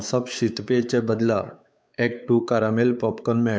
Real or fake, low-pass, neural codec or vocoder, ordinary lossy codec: fake; none; codec, 16 kHz, 4 kbps, X-Codec, WavLM features, trained on Multilingual LibriSpeech; none